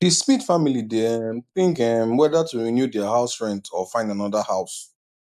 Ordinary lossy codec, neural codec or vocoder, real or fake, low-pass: none; none; real; 14.4 kHz